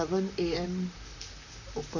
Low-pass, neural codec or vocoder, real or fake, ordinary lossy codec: 7.2 kHz; vocoder, 44.1 kHz, 128 mel bands, Pupu-Vocoder; fake; none